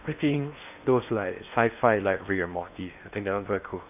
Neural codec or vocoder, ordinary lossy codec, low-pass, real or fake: codec, 16 kHz in and 24 kHz out, 0.8 kbps, FocalCodec, streaming, 65536 codes; none; 3.6 kHz; fake